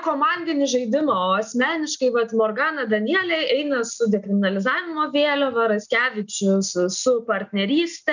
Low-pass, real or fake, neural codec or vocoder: 7.2 kHz; real; none